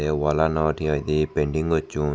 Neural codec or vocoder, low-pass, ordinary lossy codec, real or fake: none; none; none; real